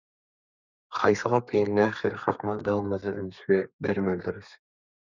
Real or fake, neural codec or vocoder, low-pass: fake; codec, 32 kHz, 1.9 kbps, SNAC; 7.2 kHz